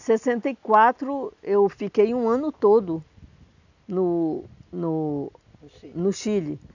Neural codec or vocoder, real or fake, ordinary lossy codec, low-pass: none; real; none; 7.2 kHz